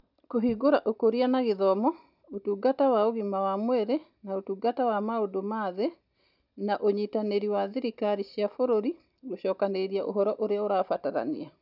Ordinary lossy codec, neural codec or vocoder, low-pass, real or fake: none; none; 5.4 kHz; real